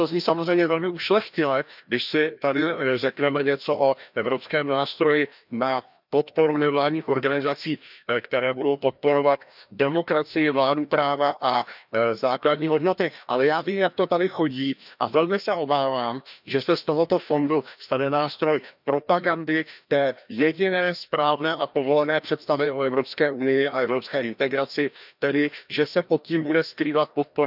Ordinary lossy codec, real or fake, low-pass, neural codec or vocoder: none; fake; 5.4 kHz; codec, 16 kHz, 1 kbps, FreqCodec, larger model